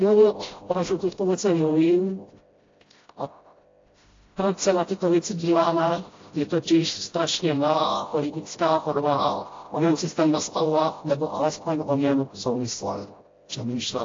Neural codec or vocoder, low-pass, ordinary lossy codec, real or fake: codec, 16 kHz, 0.5 kbps, FreqCodec, smaller model; 7.2 kHz; AAC, 32 kbps; fake